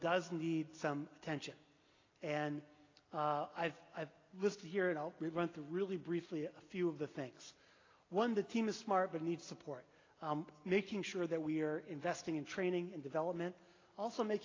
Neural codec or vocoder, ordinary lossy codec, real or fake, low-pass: none; AAC, 32 kbps; real; 7.2 kHz